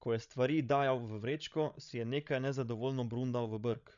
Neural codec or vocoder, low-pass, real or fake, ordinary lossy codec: codec, 16 kHz, 16 kbps, FreqCodec, larger model; 7.2 kHz; fake; none